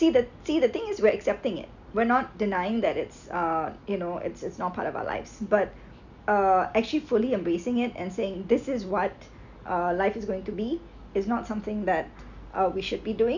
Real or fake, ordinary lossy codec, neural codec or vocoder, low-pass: real; none; none; 7.2 kHz